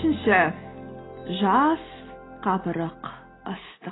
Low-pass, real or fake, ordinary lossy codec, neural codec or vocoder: 7.2 kHz; real; AAC, 16 kbps; none